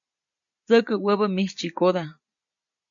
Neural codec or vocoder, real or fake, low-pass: none; real; 7.2 kHz